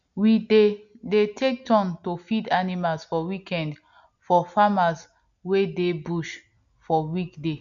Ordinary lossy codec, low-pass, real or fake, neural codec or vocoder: none; 7.2 kHz; real; none